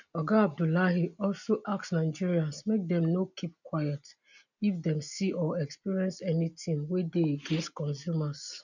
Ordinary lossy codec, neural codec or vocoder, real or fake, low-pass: none; none; real; 7.2 kHz